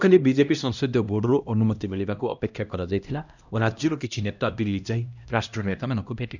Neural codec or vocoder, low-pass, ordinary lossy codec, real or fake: codec, 16 kHz, 1 kbps, X-Codec, HuBERT features, trained on LibriSpeech; 7.2 kHz; none; fake